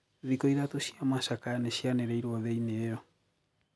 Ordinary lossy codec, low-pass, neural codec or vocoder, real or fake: none; none; none; real